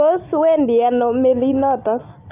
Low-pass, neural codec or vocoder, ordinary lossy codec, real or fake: 3.6 kHz; none; none; real